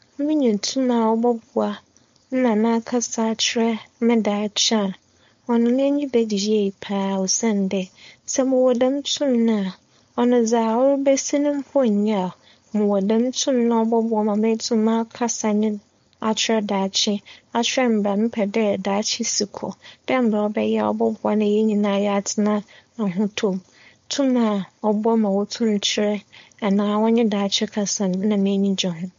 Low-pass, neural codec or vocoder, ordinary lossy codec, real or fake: 7.2 kHz; codec, 16 kHz, 4.8 kbps, FACodec; MP3, 48 kbps; fake